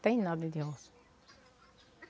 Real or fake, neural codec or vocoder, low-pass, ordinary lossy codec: real; none; none; none